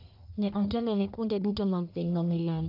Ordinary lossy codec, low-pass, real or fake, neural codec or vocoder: AAC, 48 kbps; 5.4 kHz; fake; codec, 44.1 kHz, 1.7 kbps, Pupu-Codec